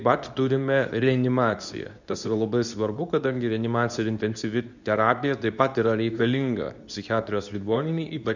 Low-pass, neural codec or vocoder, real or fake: 7.2 kHz; codec, 24 kHz, 0.9 kbps, WavTokenizer, medium speech release version 2; fake